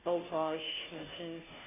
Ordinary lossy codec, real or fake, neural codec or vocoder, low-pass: MP3, 32 kbps; fake; codec, 16 kHz, 0.5 kbps, FunCodec, trained on Chinese and English, 25 frames a second; 3.6 kHz